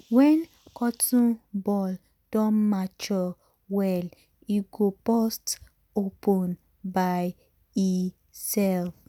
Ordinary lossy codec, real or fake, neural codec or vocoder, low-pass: none; real; none; 19.8 kHz